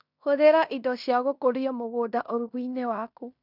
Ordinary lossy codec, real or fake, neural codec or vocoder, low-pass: none; fake; codec, 16 kHz in and 24 kHz out, 0.9 kbps, LongCat-Audio-Codec, fine tuned four codebook decoder; 5.4 kHz